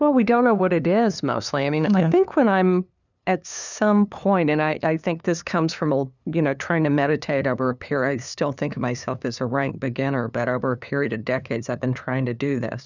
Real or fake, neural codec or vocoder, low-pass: fake; codec, 16 kHz, 2 kbps, FunCodec, trained on LibriTTS, 25 frames a second; 7.2 kHz